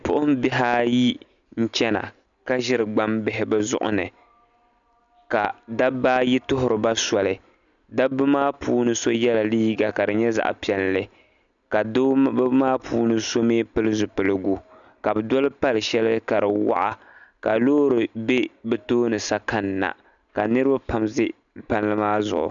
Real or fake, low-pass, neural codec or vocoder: real; 7.2 kHz; none